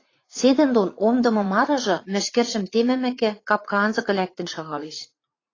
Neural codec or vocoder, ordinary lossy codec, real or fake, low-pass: vocoder, 44.1 kHz, 80 mel bands, Vocos; AAC, 32 kbps; fake; 7.2 kHz